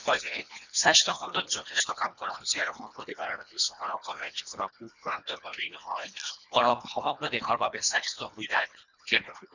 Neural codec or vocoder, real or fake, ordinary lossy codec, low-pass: codec, 24 kHz, 1.5 kbps, HILCodec; fake; none; 7.2 kHz